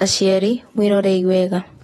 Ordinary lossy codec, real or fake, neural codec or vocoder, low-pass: AAC, 32 kbps; fake; vocoder, 48 kHz, 128 mel bands, Vocos; 19.8 kHz